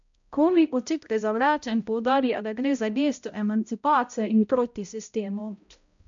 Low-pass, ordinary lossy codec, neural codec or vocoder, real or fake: 7.2 kHz; MP3, 64 kbps; codec, 16 kHz, 0.5 kbps, X-Codec, HuBERT features, trained on balanced general audio; fake